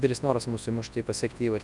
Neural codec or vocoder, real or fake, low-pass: codec, 24 kHz, 0.9 kbps, WavTokenizer, large speech release; fake; 10.8 kHz